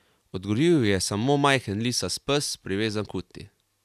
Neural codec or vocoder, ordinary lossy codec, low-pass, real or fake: none; none; 14.4 kHz; real